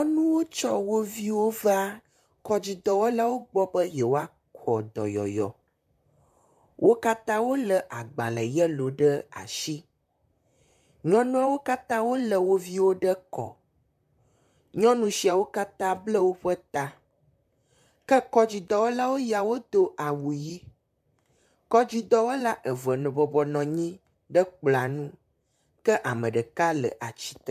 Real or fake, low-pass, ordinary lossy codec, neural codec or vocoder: fake; 14.4 kHz; MP3, 96 kbps; vocoder, 44.1 kHz, 128 mel bands, Pupu-Vocoder